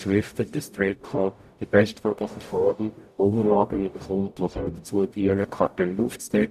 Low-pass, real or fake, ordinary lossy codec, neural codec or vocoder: 14.4 kHz; fake; none; codec, 44.1 kHz, 0.9 kbps, DAC